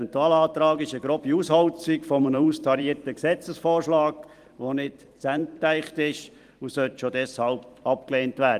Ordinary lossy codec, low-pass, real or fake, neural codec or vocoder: Opus, 24 kbps; 14.4 kHz; fake; vocoder, 44.1 kHz, 128 mel bands every 256 samples, BigVGAN v2